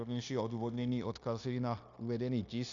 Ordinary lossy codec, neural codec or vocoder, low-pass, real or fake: AAC, 64 kbps; codec, 16 kHz, 0.9 kbps, LongCat-Audio-Codec; 7.2 kHz; fake